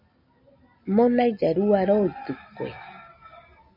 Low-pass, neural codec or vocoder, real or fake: 5.4 kHz; none; real